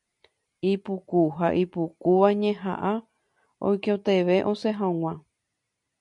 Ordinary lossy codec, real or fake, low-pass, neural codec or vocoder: MP3, 96 kbps; real; 10.8 kHz; none